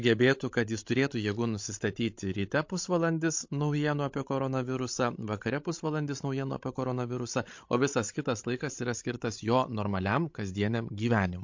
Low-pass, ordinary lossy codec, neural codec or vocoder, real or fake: 7.2 kHz; MP3, 48 kbps; codec, 16 kHz, 16 kbps, FreqCodec, larger model; fake